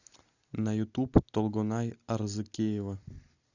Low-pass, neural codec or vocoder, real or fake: 7.2 kHz; none; real